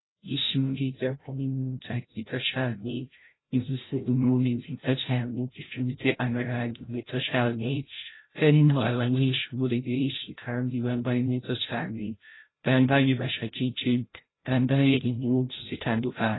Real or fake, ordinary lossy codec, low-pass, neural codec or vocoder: fake; AAC, 16 kbps; 7.2 kHz; codec, 16 kHz, 0.5 kbps, FreqCodec, larger model